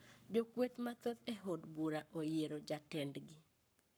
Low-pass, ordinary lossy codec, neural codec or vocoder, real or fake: none; none; codec, 44.1 kHz, 7.8 kbps, Pupu-Codec; fake